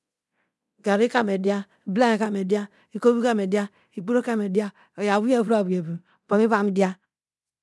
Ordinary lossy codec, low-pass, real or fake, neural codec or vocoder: none; none; fake; codec, 24 kHz, 0.9 kbps, DualCodec